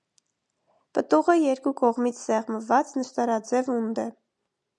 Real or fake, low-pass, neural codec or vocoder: real; 10.8 kHz; none